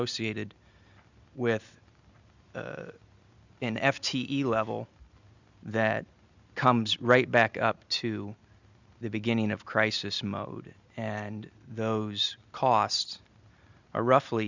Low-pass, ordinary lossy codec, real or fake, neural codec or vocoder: 7.2 kHz; Opus, 64 kbps; real; none